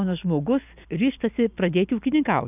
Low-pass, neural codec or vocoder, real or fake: 3.6 kHz; none; real